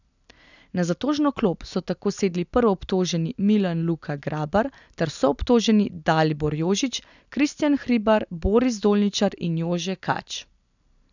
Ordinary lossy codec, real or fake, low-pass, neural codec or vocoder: none; real; 7.2 kHz; none